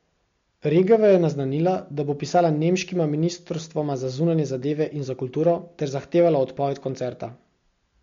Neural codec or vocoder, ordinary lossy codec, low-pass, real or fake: none; MP3, 48 kbps; 7.2 kHz; real